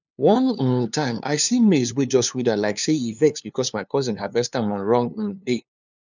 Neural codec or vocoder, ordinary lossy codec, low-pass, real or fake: codec, 16 kHz, 2 kbps, FunCodec, trained on LibriTTS, 25 frames a second; none; 7.2 kHz; fake